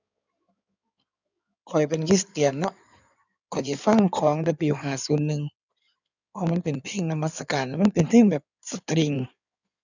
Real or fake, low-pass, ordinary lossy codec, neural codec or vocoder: fake; 7.2 kHz; none; codec, 16 kHz in and 24 kHz out, 2.2 kbps, FireRedTTS-2 codec